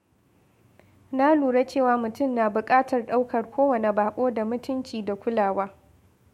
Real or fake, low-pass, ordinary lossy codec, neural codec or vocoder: fake; 19.8 kHz; MP3, 64 kbps; autoencoder, 48 kHz, 128 numbers a frame, DAC-VAE, trained on Japanese speech